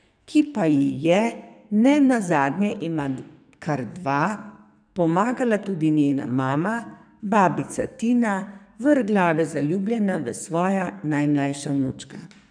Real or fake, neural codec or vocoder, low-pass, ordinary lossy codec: fake; codec, 44.1 kHz, 2.6 kbps, SNAC; 9.9 kHz; none